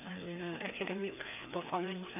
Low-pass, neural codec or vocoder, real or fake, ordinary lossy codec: 3.6 kHz; codec, 16 kHz, 2 kbps, FreqCodec, larger model; fake; none